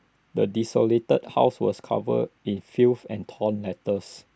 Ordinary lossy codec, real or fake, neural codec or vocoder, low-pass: none; real; none; none